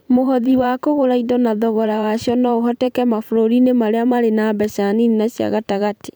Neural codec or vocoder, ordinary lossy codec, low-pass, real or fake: vocoder, 44.1 kHz, 128 mel bands every 512 samples, BigVGAN v2; none; none; fake